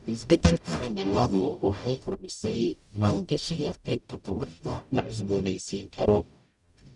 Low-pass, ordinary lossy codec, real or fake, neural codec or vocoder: 10.8 kHz; none; fake; codec, 44.1 kHz, 0.9 kbps, DAC